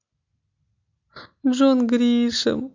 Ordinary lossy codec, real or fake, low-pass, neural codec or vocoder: MP3, 48 kbps; real; 7.2 kHz; none